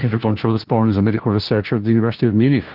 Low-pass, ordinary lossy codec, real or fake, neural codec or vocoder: 5.4 kHz; Opus, 16 kbps; fake; codec, 16 kHz, 1 kbps, FunCodec, trained on LibriTTS, 50 frames a second